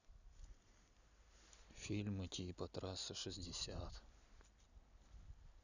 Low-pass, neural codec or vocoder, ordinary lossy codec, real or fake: 7.2 kHz; none; none; real